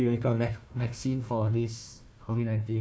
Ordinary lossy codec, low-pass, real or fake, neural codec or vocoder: none; none; fake; codec, 16 kHz, 1 kbps, FunCodec, trained on Chinese and English, 50 frames a second